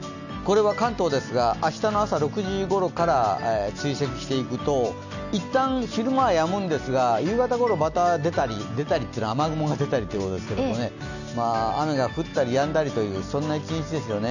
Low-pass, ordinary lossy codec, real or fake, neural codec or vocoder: 7.2 kHz; none; real; none